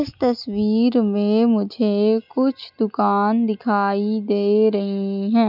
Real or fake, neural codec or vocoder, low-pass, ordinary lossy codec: real; none; 5.4 kHz; none